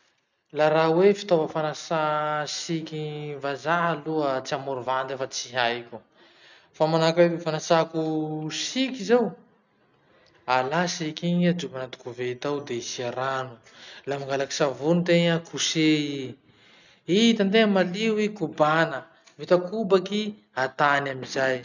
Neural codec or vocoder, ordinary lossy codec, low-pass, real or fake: none; none; 7.2 kHz; real